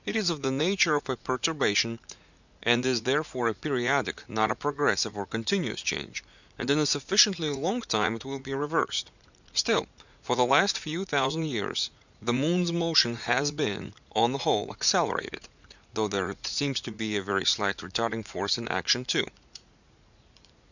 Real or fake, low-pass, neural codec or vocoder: fake; 7.2 kHz; vocoder, 44.1 kHz, 80 mel bands, Vocos